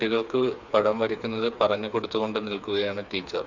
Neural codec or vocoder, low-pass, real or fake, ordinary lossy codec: codec, 16 kHz, 4 kbps, FreqCodec, smaller model; 7.2 kHz; fake; none